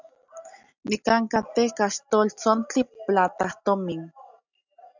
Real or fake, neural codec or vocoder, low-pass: real; none; 7.2 kHz